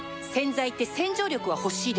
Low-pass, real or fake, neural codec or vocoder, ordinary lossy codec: none; real; none; none